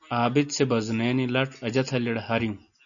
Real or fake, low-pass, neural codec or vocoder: real; 7.2 kHz; none